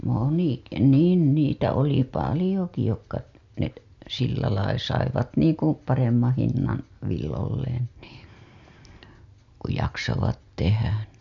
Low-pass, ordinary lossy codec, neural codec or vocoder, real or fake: 7.2 kHz; MP3, 64 kbps; none; real